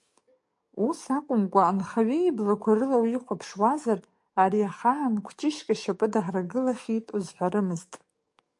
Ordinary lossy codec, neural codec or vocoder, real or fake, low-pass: MP3, 64 kbps; codec, 44.1 kHz, 7.8 kbps, DAC; fake; 10.8 kHz